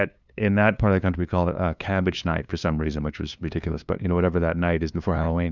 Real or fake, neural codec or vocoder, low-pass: fake; codec, 16 kHz, 2 kbps, FunCodec, trained on LibriTTS, 25 frames a second; 7.2 kHz